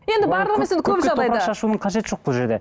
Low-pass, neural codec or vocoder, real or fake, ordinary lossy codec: none; none; real; none